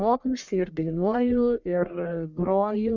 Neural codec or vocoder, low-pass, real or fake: codec, 44.1 kHz, 1.7 kbps, Pupu-Codec; 7.2 kHz; fake